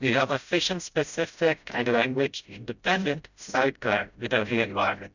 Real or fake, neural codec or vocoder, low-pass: fake; codec, 16 kHz, 0.5 kbps, FreqCodec, smaller model; 7.2 kHz